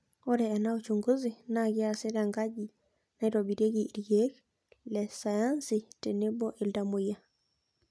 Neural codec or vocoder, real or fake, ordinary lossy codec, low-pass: none; real; none; none